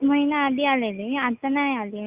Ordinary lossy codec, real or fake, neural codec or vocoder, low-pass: Opus, 64 kbps; real; none; 3.6 kHz